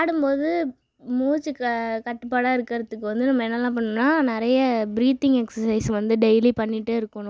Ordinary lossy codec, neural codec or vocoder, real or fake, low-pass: none; none; real; none